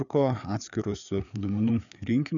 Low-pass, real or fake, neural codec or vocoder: 7.2 kHz; fake; codec, 16 kHz, 4 kbps, FreqCodec, larger model